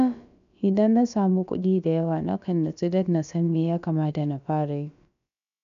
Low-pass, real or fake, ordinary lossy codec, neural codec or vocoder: 7.2 kHz; fake; none; codec, 16 kHz, about 1 kbps, DyCAST, with the encoder's durations